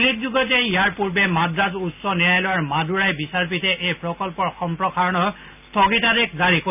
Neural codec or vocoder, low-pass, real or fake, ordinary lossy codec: none; 3.6 kHz; real; none